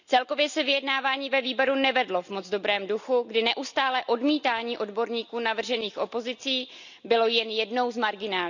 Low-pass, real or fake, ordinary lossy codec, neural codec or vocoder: 7.2 kHz; real; none; none